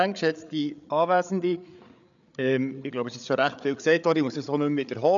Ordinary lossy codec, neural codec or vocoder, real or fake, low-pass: none; codec, 16 kHz, 8 kbps, FreqCodec, larger model; fake; 7.2 kHz